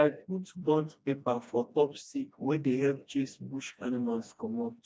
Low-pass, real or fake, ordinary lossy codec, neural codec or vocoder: none; fake; none; codec, 16 kHz, 1 kbps, FreqCodec, smaller model